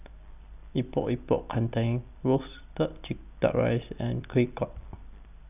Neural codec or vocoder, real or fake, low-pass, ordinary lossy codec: none; real; 3.6 kHz; none